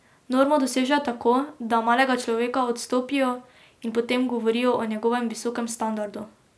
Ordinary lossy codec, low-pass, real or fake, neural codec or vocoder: none; none; real; none